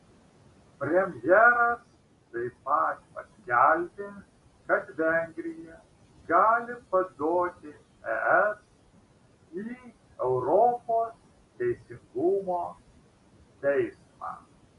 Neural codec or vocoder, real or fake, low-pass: none; real; 10.8 kHz